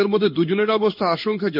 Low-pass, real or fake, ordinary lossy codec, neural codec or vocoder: 5.4 kHz; fake; none; codec, 16 kHz in and 24 kHz out, 1 kbps, XY-Tokenizer